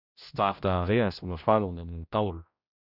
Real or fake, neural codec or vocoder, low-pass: fake; codec, 16 kHz, 0.5 kbps, X-Codec, HuBERT features, trained on general audio; 5.4 kHz